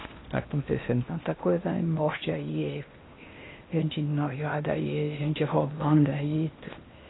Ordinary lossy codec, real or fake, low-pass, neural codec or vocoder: AAC, 16 kbps; fake; 7.2 kHz; codec, 16 kHz in and 24 kHz out, 0.8 kbps, FocalCodec, streaming, 65536 codes